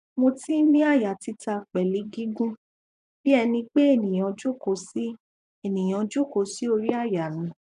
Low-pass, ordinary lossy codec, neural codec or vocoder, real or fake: 10.8 kHz; none; vocoder, 24 kHz, 100 mel bands, Vocos; fake